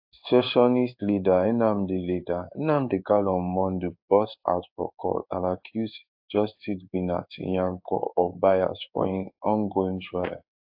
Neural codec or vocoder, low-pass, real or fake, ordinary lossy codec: codec, 16 kHz in and 24 kHz out, 1 kbps, XY-Tokenizer; 5.4 kHz; fake; AAC, 48 kbps